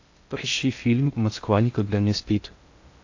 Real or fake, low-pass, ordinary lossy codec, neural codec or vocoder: fake; 7.2 kHz; AAC, 48 kbps; codec, 16 kHz in and 24 kHz out, 0.6 kbps, FocalCodec, streaming, 2048 codes